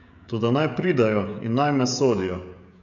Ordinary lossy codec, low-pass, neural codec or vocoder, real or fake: none; 7.2 kHz; codec, 16 kHz, 16 kbps, FreqCodec, smaller model; fake